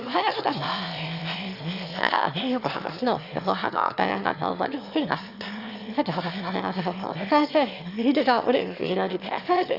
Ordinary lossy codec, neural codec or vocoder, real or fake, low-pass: Opus, 64 kbps; autoencoder, 22.05 kHz, a latent of 192 numbers a frame, VITS, trained on one speaker; fake; 5.4 kHz